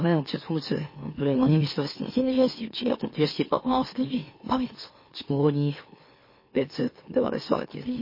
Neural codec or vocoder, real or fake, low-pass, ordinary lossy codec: autoencoder, 44.1 kHz, a latent of 192 numbers a frame, MeloTTS; fake; 5.4 kHz; MP3, 24 kbps